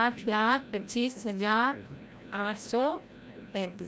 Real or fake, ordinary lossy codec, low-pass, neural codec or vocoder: fake; none; none; codec, 16 kHz, 0.5 kbps, FreqCodec, larger model